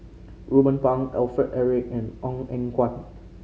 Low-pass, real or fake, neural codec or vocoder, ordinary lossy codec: none; real; none; none